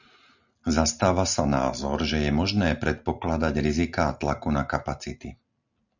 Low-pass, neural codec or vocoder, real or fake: 7.2 kHz; none; real